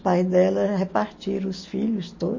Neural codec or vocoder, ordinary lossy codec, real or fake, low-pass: none; MP3, 32 kbps; real; 7.2 kHz